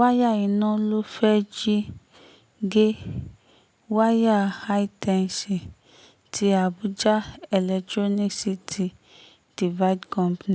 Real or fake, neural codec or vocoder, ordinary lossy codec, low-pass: real; none; none; none